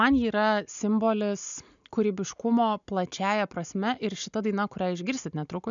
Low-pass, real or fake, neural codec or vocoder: 7.2 kHz; real; none